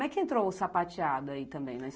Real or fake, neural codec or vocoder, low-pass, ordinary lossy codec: real; none; none; none